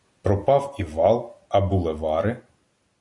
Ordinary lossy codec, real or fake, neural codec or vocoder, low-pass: MP3, 96 kbps; real; none; 10.8 kHz